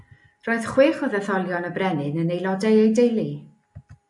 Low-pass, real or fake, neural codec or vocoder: 10.8 kHz; real; none